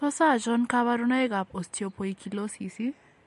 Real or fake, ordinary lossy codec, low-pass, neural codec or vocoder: real; MP3, 48 kbps; 14.4 kHz; none